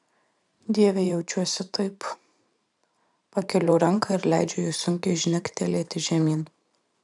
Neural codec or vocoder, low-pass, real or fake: vocoder, 44.1 kHz, 128 mel bands every 512 samples, BigVGAN v2; 10.8 kHz; fake